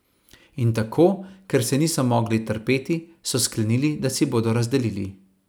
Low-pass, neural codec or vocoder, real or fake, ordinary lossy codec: none; vocoder, 44.1 kHz, 128 mel bands every 256 samples, BigVGAN v2; fake; none